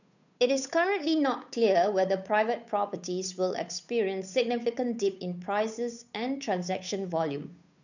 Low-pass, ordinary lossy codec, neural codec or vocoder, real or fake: 7.2 kHz; none; codec, 16 kHz, 8 kbps, FunCodec, trained on Chinese and English, 25 frames a second; fake